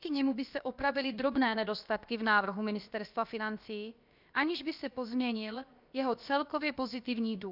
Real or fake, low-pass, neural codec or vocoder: fake; 5.4 kHz; codec, 16 kHz, about 1 kbps, DyCAST, with the encoder's durations